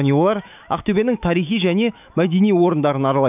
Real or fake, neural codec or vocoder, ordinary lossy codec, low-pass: fake; autoencoder, 48 kHz, 128 numbers a frame, DAC-VAE, trained on Japanese speech; none; 3.6 kHz